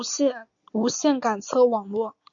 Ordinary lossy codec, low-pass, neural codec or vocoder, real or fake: AAC, 64 kbps; 7.2 kHz; none; real